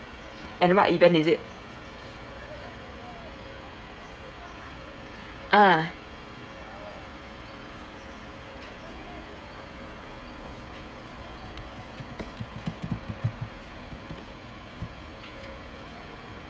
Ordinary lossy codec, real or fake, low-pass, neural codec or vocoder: none; fake; none; codec, 16 kHz, 16 kbps, FreqCodec, smaller model